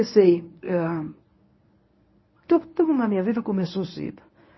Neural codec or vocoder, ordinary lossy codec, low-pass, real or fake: codec, 24 kHz, 0.9 kbps, WavTokenizer, medium speech release version 1; MP3, 24 kbps; 7.2 kHz; fake